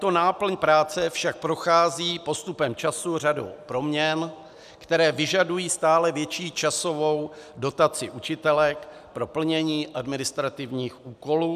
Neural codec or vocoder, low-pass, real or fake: none; 14.4 kHz; real